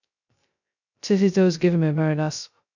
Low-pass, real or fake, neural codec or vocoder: 7.2 kHz; fake; codec, 16 kHz, 0.2 kbps, FocalCodec